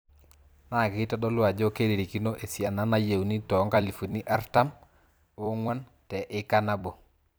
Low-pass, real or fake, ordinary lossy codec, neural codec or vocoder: none; fake; none; vocoder, 44.1 kHz, 128 mel bands every 256 samples, BigVGAN v2